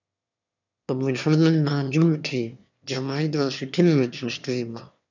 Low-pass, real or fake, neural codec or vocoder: 7.2 kHz; fake; autoencoder, 22.05 kHz, a latent of 192 numbers a frame, VITS, trained on one speaker